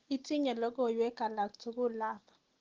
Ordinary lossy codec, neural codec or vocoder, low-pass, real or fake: Opus, 16 kbps; none; 7.2 kHz; real